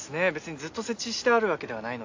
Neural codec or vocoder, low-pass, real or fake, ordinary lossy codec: none; 7.2 kHz; real; AAC, 48 kbps